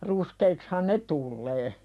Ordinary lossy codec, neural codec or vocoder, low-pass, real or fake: none; none; none; real